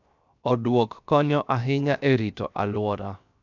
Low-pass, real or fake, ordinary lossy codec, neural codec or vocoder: 7.2 kHz; fake; none; codec, 16 kHz, 0.7 kbps, FocalCodec